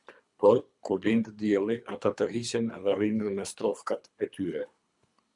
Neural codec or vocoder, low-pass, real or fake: codec, 24 kHz, 3 kbps, HILCodec; 10.8 kHz; fake